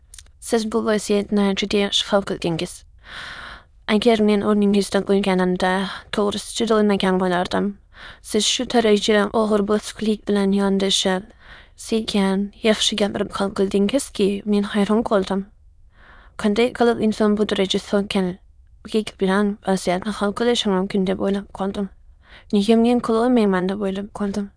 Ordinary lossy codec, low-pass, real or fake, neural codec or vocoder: none; none; fake; autoencoder, 22.05 kHz, a latent of 192 numbers a frame, VITS, trained on many speakers